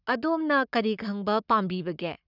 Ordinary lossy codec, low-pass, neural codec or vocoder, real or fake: none; 5.4 kHz; codec, 44.1 kHz, 7.8 kbps, Pupu-Codec; fake